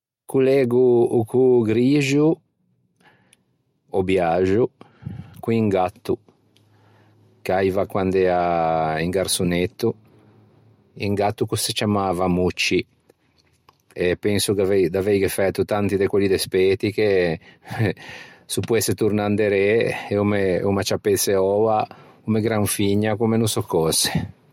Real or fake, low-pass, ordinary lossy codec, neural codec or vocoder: real; 19.8 kHz; MP3, 64 kbps; none